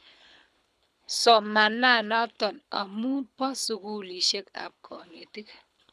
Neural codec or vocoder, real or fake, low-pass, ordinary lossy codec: codec, 24 kHz, 6 kbps, HILCodec; fake; none; none